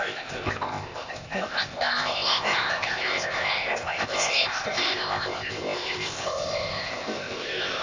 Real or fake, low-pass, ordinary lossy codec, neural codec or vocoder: fake; 7.2 kHz; AAC, 48 kbps; codec, 16 kHz, 0.8 kbps, ZipCodec